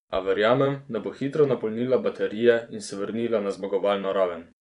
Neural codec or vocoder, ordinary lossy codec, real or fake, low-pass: vocoder, 24 kHz, 100 mel bands, Vocos; none; fake; 10.8 kHz